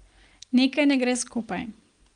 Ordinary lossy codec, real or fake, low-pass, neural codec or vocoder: Opus, 32 kbps; real; 9.9 kHz; none